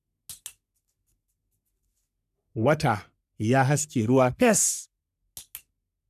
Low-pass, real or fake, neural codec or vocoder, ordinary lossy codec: 14.4 kHz; fake; codec, 44.1 kHz, 3.4 kbps, Pupu-Codec; none